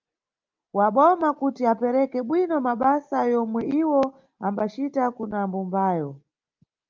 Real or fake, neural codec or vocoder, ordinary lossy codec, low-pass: real; none; Opus, 24 kbps; 7.2 kHz